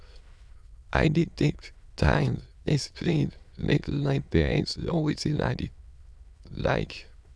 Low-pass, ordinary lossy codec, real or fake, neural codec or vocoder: none; none; fake; autoencoder, 22.05 kHz, a latent of 192 numbers a frame, VITS, trained on many speakers